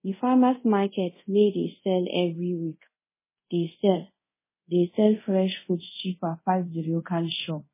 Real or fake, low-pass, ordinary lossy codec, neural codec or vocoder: fake; 3.6 kHz; MP3, 16 kbps; codec, 24 kHz, 0.5 kbps, DualCodec